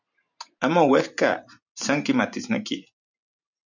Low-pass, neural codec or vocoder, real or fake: 7.2 kHz; vocoder, 44.1 kHz, 128 mel bands every 512 samples, BigVGAN v2; fake